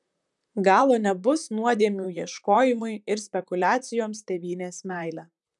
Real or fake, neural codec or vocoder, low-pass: fake; vocoder, 44.1 kHz, 128 mel bands, Pupu-Vocoder; 10.8 kHz